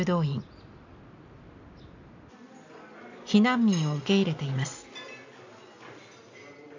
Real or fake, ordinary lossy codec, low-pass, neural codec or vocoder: real; AAC, 48 kbps; 7.2 kHz; none